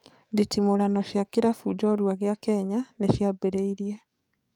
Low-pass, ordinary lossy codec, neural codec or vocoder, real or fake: 19.8 kHz; none; codec, 44.1 kHz, 7.8 kbps, DAC; fake